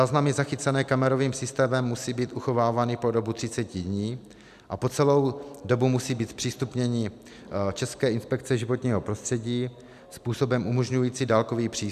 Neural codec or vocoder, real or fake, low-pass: none; real; 14.4 kHz